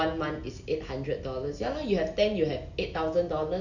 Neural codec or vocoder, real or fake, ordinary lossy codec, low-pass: none; real; none; 7.2 kHz